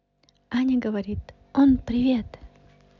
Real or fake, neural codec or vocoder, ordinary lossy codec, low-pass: real; none; none; 7.2 kHz